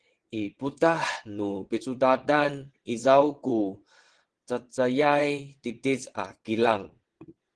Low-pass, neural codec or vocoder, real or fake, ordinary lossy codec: 9.9 kHz; vocoder, 22.05 kHz, 80 mel bands, WaveNeXt; fake; Opus, 16 kbps